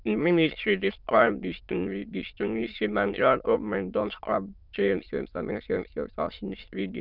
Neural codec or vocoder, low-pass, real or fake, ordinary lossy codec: autoencoder, 22.05 kHz, a latent of 192 numbers a frame, VITS, trained on many speakers; 5.4 kHz; fake; none